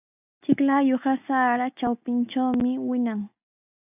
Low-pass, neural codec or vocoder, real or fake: 3.6 kHz; none; real